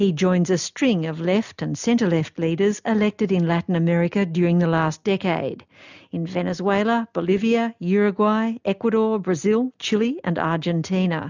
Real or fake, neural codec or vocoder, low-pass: real; none; 7.2 kHz